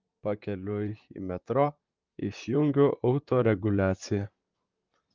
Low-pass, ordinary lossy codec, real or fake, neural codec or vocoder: 7.2 kHz; Opus, 24 kbps; fake; vocoder, 44.1 kHz, 128 mel bands, Pupu-Vocoder